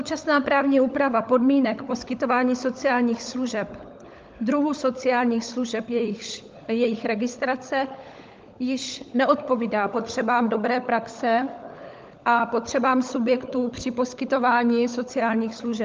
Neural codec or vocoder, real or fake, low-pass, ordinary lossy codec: codec, 16 kHz, 16 kbps, FunCodec, trained on LibriTTS, 50 frames a second; fake; 7.2 kHz; Opus, 24 kbps